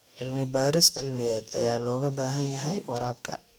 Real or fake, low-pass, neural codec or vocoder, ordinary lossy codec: fake; none; codec, 44.1 kHz, 2.6 kbps, DAC; none